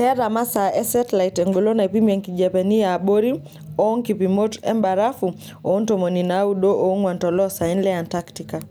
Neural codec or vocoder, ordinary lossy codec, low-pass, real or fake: none; none; none; real